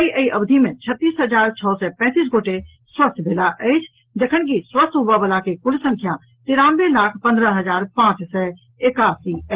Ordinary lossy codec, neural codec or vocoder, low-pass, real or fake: Opus, 16 kbps; none; 3.6 kHz; real